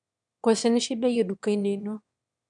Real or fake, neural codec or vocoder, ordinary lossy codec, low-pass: fake; autoencoder, 22.05 kHz, a latent of 192 numbers a frame, VITS, trained on one speaker; none; 9.9 kHz